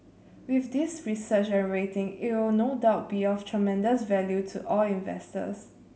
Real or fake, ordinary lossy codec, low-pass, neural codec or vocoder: real; none; none; none